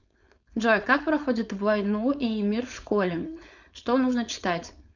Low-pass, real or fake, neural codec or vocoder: 7.2 kHz; fake; codec, 16 kHz, 4.8 kbps, FACodec